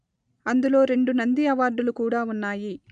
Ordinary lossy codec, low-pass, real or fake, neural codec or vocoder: AAC, 96 kbps; 9.9 kHz; real; none